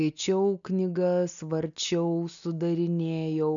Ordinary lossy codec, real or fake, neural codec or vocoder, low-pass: MP3, 96 kbps; real; none; 7.2 kHz